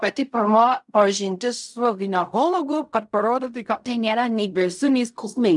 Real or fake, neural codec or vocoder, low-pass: fake; codec, 16 kHz in and 24 kHz out, 0.4 kbps, LongCat-Audio-Codec, fine tuned four codebook decoder; 10.8 kHz